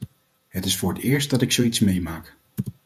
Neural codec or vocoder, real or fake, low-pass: vocoder, 48 kHz, 128 mel bands, Vocos; fake; 14.4 kHz